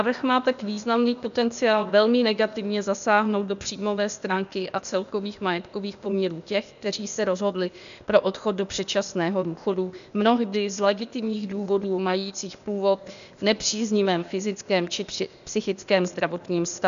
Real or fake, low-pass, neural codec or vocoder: fake; 7.2 kHz; codec, 16 kHz, 0.8 kbps, ZipCodec